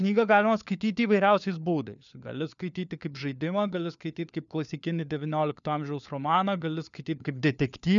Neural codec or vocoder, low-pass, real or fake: codec, 16 kHz, 4 kbps, FunCodec, trained on LibriTTS, 50 frames a second; 7.2 kHz; fake